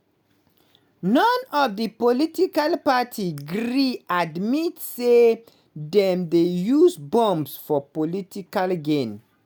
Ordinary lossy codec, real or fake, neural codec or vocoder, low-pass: none; real; none; none